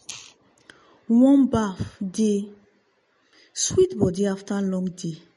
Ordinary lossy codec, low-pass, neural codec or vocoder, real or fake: MP3, 48 kbps; 19.8 kHz; none; real